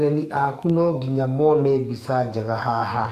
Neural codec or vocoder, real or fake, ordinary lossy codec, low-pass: codec, 44.1 kHz, 2.6 kbps, SNAC; fake; none; 14.4 kHz